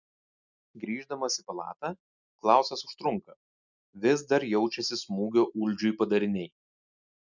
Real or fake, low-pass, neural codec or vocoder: real; 7.2 kHz; none